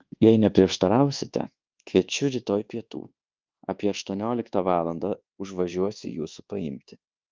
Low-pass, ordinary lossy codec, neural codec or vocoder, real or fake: 7.2 kHz; Opus, 24 kbps; codec, 24 kHz, 1.2 kbps, DualCodec; fake